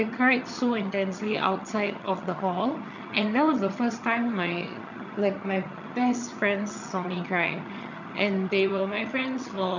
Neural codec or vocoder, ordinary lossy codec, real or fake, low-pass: vocoder, 22.05 kHz, 80 mel bands, HiFi-GAN; none; fake; 7.2 kHz